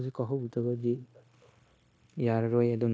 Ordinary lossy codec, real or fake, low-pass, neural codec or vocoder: none; fake; none; codec, 16 kHz, 0.9 kbps, LongCat-Audio-Codec